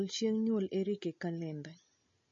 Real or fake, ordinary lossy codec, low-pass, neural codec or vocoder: real; MP3, 32 kbps; 7.2 kHz; none